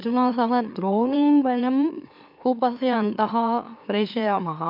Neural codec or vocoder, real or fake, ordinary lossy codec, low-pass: autoencoder, 44.1 kHz, a latent of 192 numbers a frame, MeloTTS; fake; none; 5.4 kHz